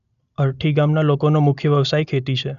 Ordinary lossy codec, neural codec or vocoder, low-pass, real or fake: none; none; 7.2 kHz; real